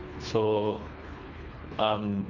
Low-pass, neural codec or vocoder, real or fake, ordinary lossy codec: 7.2 kHz; codec, 24 kHz, 3 kbps, HILCodec; fake; none